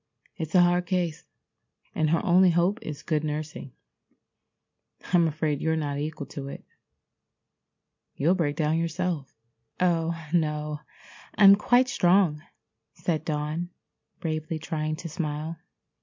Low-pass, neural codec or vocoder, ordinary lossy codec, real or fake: 7.2 kHz; none; MP3, 48 kbps; real